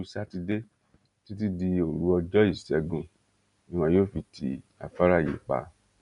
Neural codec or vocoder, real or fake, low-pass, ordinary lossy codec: none; real; 10.8 kHz; none